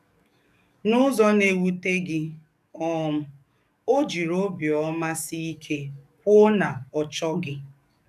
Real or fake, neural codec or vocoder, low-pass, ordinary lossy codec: fake; codec, 44.1 kHz, 7.8 kbps, DAC; 14.4 kHz; none